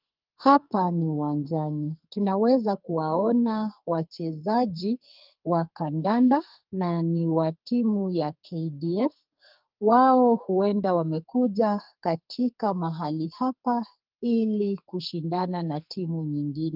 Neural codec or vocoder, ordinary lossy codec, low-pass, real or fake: codec, 44.1 kHz, 2.6 kbps, SNAC; Opus, 24 kbps; 5.4 kHz; fake